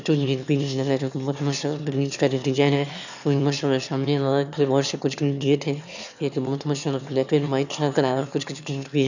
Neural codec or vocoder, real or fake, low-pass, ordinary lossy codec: autoencoder, 22.05 kHz, a latent of 192 numbers a frame, VITS, trained on one speaker; fake; 7.2 kHz; none